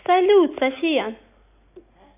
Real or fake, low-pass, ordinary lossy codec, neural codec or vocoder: real; 3.6 kHz; none; none